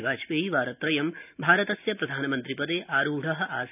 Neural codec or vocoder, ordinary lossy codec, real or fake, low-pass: none; none; real; 3.6 kHz